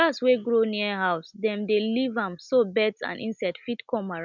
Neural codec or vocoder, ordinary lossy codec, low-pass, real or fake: none; none; 7.2 kHz; real